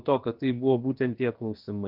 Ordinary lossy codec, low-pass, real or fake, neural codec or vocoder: Opus, 16 kbps; 5.4 kHz; fake; codec, 16 kHz, about 1 kbps, DyCAST, with the encoder's durations